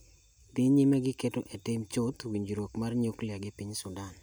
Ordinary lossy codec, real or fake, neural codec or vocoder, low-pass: none; real; none; none